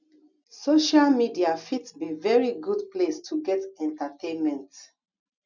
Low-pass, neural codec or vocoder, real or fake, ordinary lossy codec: 7.2 kHz; none; real; none